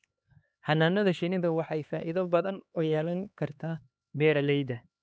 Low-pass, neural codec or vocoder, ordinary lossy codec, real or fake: none; codec, 16 kHz, 2 kbps, X-Codec, HuBERT features, trained on LibriSpeech; none; fake